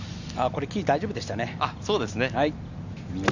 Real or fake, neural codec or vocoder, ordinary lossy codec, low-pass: real; none; none; 7.2 kHz